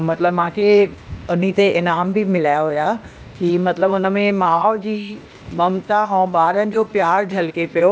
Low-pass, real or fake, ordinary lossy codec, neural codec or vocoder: none; fake; none; codec, 16 kHz, 0.8 kbps, ZipCodec